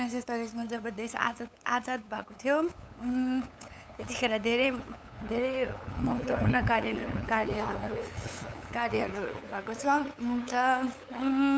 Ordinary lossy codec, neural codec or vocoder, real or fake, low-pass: none; codec, 16 kHz, 8 kbps, FunCodec, trained on LibriTTS, 25 frames a second; fake; none